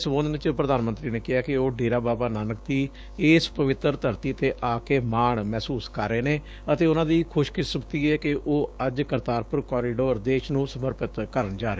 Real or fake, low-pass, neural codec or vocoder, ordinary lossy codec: fake; none; codec, 16 kHz, 6 kbps, DAC; none